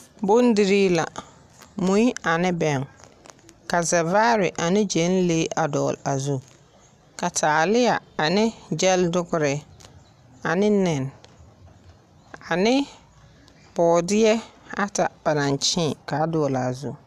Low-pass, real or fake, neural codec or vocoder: 14.4 kHz; real; none